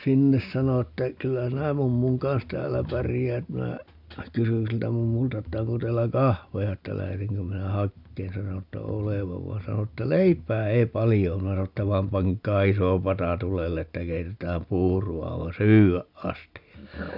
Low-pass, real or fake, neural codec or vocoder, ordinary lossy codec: 5.4 kHz; real; none; none